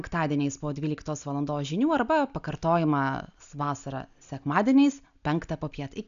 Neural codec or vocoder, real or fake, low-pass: none; real; 7.2 kHz